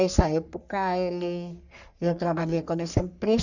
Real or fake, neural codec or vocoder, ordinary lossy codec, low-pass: fake; codec, 44.1 kHz, 3.4 kbps, Pupu-Codec; none; 7.2 kHz